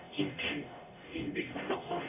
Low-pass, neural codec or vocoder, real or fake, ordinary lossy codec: 3.6 kHz; codec, 44.1 kHz, 0.9 kbps, DAC; fake; AAC, 32 kbps